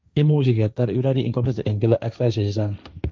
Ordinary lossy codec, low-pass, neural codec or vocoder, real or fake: none; none; codec, 16 kHz, 1.1 kbps, Voila-Tokenizer; fake